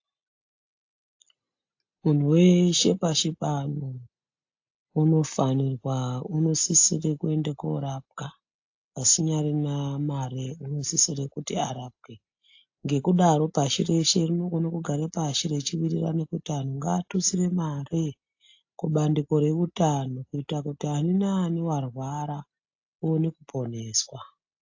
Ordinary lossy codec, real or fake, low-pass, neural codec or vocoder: AAC, 48 kbps; real; 7.2 kHz; none